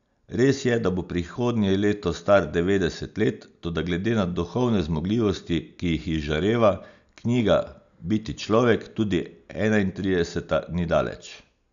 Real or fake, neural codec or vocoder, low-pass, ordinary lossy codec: real; none; 7.2 kHz; none